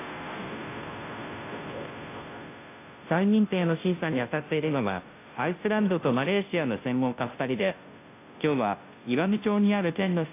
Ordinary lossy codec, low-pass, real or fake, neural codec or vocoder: AAC, 24 kbps; 3.6 kHz; fake; codec, 16 kHz, 0.5 kbps, FunCodec, trained on Chinese and English, 25 frames a second